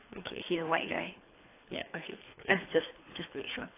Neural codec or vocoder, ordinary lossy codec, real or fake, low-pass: codec, 24 kHz, 3 kbps, HILCodec; AAC, 24 kbps; fake; 3.6 kHz